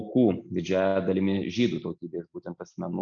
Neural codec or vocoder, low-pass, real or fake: none; 7.2 kHz; real